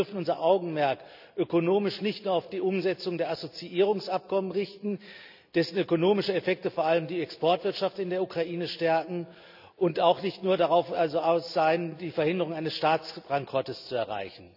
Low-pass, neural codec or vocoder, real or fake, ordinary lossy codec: 5.4 kHz; none; real; none